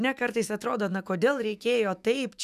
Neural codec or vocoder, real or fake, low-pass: autoencoder, 48 kHz, 128 numbers a frame, DAC-VAE, trained on Japanese speech; fake; 14.4 kHz